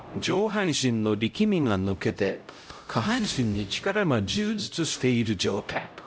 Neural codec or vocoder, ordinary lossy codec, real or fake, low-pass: codec, 16 kHz, 0.5 kbps, X-Codec, HuBERT features, trained on LibriSpeech; none; fake; none